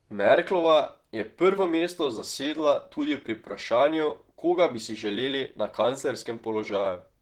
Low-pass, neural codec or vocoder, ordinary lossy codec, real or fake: 19.8 kHz; vocoder, 44.1 kHz, 128 mel bands, Pupu-Vocoder; Opus, 16 kbps; fake